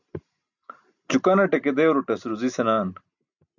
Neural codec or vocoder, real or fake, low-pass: none; real; 7.2 kHz